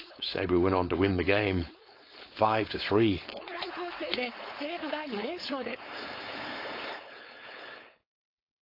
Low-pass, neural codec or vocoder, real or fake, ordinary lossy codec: 5.4 kHz; codec, 16 kHz, 4.8 kbps, FACodec; fake; AAC, 32 kbps